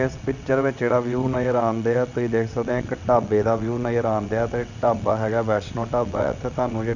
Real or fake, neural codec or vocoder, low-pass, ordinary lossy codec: fake; vocoder, 22.05 kHz, 80 mel bands, WaveNeXt; 7.2 kHz; none